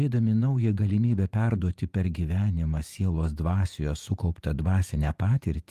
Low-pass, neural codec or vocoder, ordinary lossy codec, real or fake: 14.4 kHz; vocoder, 48 kHz, 128 mel bands, Vocos; Opus, 32 kbps; fake